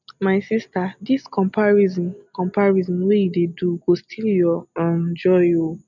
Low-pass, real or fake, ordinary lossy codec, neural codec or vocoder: 7.2 kHz; real; none; none